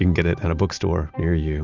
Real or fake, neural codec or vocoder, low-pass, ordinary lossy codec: real; none; 7.2 kHz; Opus, 64 kbps